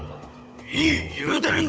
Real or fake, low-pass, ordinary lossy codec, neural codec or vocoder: fake; none; none; codec, 16 kHz, 16 kbps, FunCodec, trained on Chinese and English, 50 frames a second